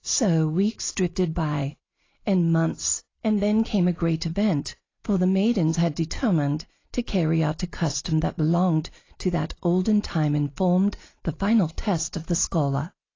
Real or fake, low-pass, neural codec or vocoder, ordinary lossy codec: real; 7.2 kHz; none; AAC, 32 kbps